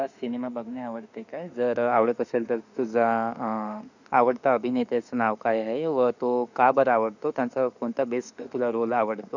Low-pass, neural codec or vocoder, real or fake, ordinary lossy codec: 7.2 kHz; autoencoder, 48 kHz, 32 numbers a frame, DAC-VAE, trained on Japanese speech; fake; none